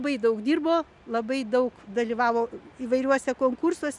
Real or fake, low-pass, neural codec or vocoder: real; 10.8 kHz; none